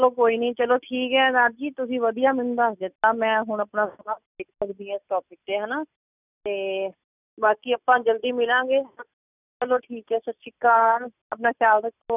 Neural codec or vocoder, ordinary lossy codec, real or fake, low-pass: none; none; real; 3.6 kHz